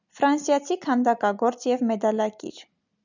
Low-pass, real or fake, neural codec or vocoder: 7.2 kHz; real; none